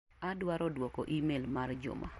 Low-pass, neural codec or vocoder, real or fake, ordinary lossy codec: 19.8 kHz; vocoder, 44.1 kHz, 128 mel bands every 256 samples, BigVGAN v2; fake; MP3, 48 kbps